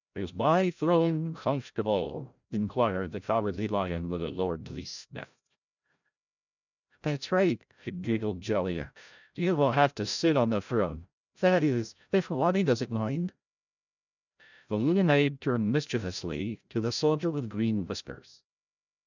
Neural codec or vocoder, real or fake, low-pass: codec, 16 kHz, 0.5 kbps, FreqCodec, larger model; fake; 7.2 kHz